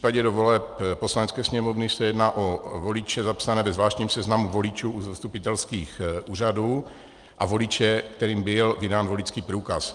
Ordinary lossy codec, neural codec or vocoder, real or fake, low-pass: Opus, 32 kbps; none; real; 10.8 kHz